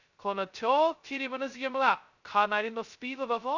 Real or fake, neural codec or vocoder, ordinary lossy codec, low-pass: fake; codec, 16 kHz, 0.2 kbps, FocalCodec; none; 7.2 kHz